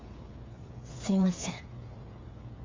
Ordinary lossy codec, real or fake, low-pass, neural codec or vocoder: AAC, 32 kbps; fake; 7.2 kHz; codec, 16 kHz, 8 kbps, FreqCodec, smaller model